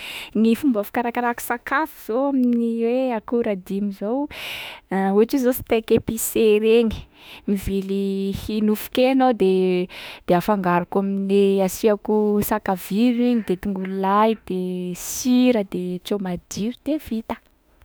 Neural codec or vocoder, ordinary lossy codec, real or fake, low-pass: autoencoder, 48 kHz, 32 numbers a frame, DAC-VAE, trained on Japanese speech; none; fake; none